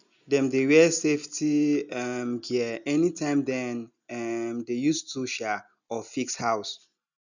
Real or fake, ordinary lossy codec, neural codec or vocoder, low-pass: real; none; none; 7.2 kHz